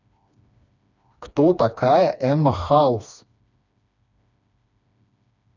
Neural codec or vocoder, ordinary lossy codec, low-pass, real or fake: codec, 16 kHz, 2 kbps, FreqCodec, smaller model; none; 7.2 kHz; fake